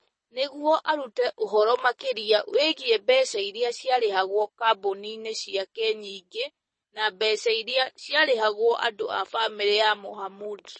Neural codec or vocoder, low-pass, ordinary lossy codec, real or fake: codec, 24 kHz, 6 kbps, HILCodec; 9.9 kHz; MP3, 32 kbps; fake